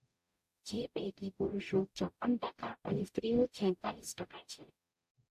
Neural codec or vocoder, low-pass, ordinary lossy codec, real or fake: codec, 44.1 kHz, 0.9 kbps, DAC; 14.4 kHz; AAC, 96 kbps; fake